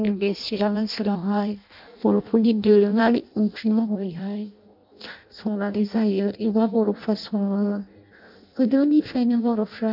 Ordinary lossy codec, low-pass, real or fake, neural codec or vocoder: MP3, 48 kbps; 5.4 kHz; fake; codec, 16 kHz in and 24 kHz out, 0.6 kbps, FireRedTTS-2 codec